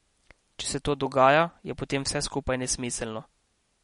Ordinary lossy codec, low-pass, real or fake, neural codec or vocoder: MP3, 48 kbps; 10.8 kHz; real; none